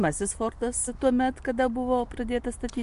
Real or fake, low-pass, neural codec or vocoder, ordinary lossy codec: real; 10.8 kHz; none; MP3, 64 kbps